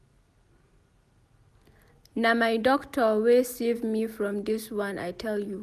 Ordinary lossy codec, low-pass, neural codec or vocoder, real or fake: none; 14.4 kHz; none; real